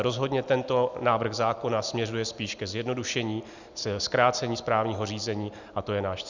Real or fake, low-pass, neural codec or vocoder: real; 7.2 kHz; none